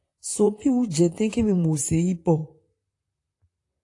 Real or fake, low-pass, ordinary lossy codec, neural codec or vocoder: fake; 10.8 kHz; AAC, 48 kbps; vocoder, 44.1 kHz, 128 mel bands, Pupu-Vocoder